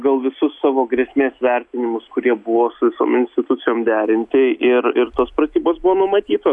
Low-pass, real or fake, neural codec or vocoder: 10.8 kHz; real; none